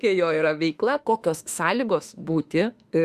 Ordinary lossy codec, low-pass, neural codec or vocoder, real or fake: Opus, 64 kbps; 14.4 kHz; autoencoder, 48 kHz, 32 numbers a frame, DAC-VAE, trained on Japanese speech; fake